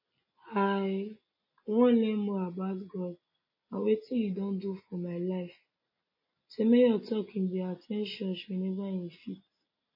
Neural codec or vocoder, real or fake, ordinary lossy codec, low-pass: none; real; MP3, 24 kbps; 5.4 kHz